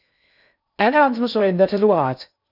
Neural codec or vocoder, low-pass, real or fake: codec, 16 kHz in and 24 kHz out, 0.6 kbps, FocalCodec, streaming, 2048 codes; 5.4 kHz; fake